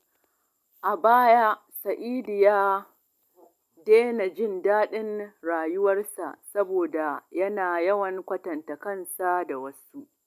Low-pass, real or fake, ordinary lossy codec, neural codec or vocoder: 14.4 kHz; real; none; none